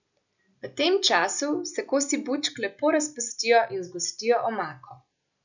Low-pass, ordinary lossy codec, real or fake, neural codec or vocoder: 7.2 kHz; none; real; none